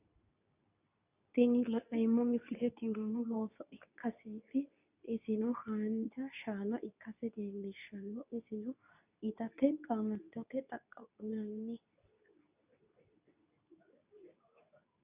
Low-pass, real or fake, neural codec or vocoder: 3.6 kHz; fake; codec, 24 kHz, 0.9 kbps, WavTokenizer, medium speech release version 2